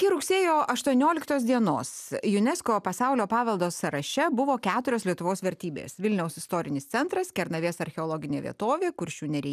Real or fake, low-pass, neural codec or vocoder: real; 14.4 kHz; none